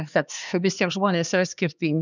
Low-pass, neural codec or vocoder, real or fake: 7.2 kHz; codec, 16 kHz, 2 kbps, X-Codec, HuBERT features, trained on LibriSpeech; fake